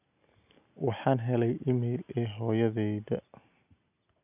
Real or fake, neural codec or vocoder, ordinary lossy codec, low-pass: real; none; none; 3.6 kHz